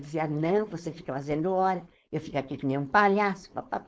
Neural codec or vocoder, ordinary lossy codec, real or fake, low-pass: codec, 16 kHz, 4.8 kbps, FACodec; none; fake; none